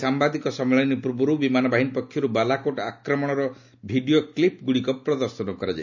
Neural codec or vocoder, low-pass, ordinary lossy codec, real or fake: none; 7.2 kHz; none; real